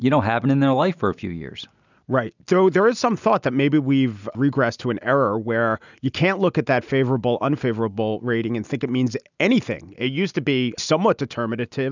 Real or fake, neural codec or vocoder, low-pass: real; none; 7.2 kHz